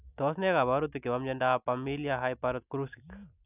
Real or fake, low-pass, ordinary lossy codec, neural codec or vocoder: real; 3.6 kHz; none; none